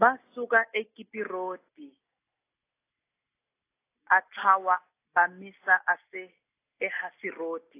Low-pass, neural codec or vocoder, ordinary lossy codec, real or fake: 3.6 kHz; none; AAC, 24 kbps; real